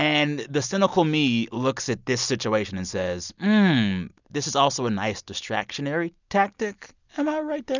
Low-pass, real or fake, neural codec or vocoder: 7.2 kHz; real; none